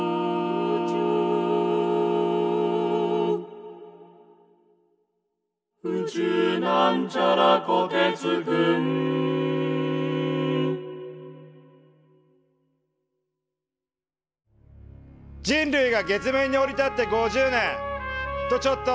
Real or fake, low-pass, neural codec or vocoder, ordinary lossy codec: real; none; none; none